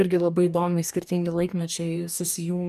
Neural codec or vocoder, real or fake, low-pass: codec, 44.1 kHz, 2.6 kbps, DAC; fake; 14.4 kHz